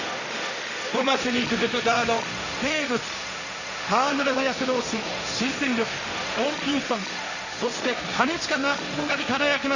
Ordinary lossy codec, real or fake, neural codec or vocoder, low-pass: none; fake; codec, 16 kHz, 1.1 kbps, Voila-Tokenizer; 7.2 kHz